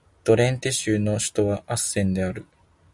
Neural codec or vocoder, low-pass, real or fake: none; 10.8 kHz; real